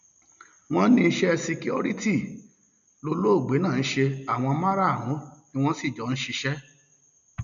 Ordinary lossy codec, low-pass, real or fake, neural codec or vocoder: none; 7.2 kHz; real; none